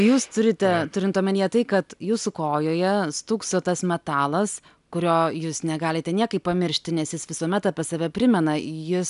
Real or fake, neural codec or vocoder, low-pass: real; none; 10.8 kHz